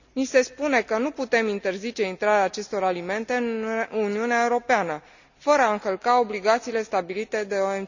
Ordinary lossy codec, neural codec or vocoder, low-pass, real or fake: none; none; 7.2 kHz; real